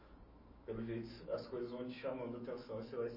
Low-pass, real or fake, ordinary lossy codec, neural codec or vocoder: 5.4 kHz; fake; MP3, 24 kbps; autoencoder, 48 kHz, 128 numbers a frame, DAC-VAE, trained on Japanese speech